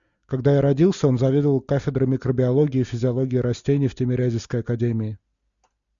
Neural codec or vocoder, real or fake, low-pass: none; real; 7.2 kHz